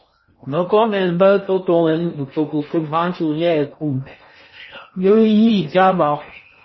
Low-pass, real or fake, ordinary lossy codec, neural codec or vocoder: 7.2 kHz; fake; MP3, 24 kbps; codec, 16 kHz in and 24 kHz out, 0.6 kbps, FocalCodec, streaming, 4096 codes